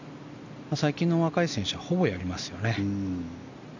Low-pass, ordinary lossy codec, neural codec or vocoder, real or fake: 7.2 kHz; none; none; real